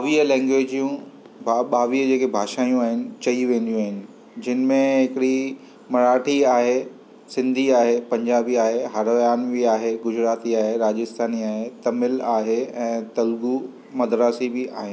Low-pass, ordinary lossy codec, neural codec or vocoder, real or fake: none; none; none; real